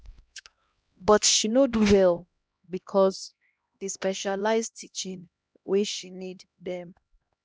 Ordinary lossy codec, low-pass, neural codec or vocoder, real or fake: none; none; codec, 16 kHz, 1 kbps, X-Codec, HuBERT features, trained on LibriSpeech; fake